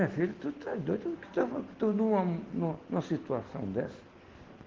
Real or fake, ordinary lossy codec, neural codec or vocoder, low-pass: real; Opus, 16 kbps; none; 7.2 kHz